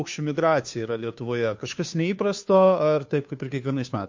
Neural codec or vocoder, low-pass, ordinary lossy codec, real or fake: codec, 16 kHz, 2 kbps, FunCodec, trained on Chinese and English, 25 frames a second; 7.2 kHz; MP3, 48 kbps; fake